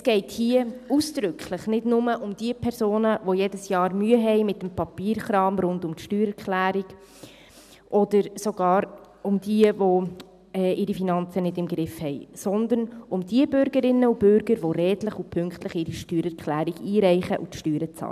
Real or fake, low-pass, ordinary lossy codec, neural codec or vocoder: real; 14.4 kHz; none; none